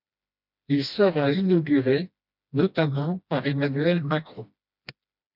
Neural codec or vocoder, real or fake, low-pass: codec, 16 kHz, 1 kbps, FreqCodec, smaller model; fake; 5.4 kHz